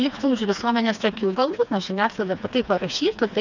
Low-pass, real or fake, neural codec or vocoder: 7.2 kHz; fake; codec, 16 kHz, 2 kbps, FreqCodec, smaller model